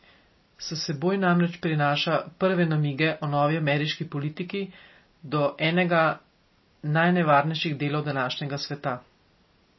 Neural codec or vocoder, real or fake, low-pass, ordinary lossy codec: none; real; 7.2 kHz; MP3, 24 kbps